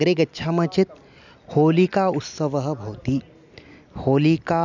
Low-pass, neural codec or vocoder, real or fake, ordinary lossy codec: 7.2 kHz; none; real; none